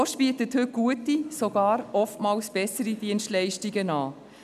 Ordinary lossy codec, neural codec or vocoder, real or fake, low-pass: none; none; real; 14.4 kHz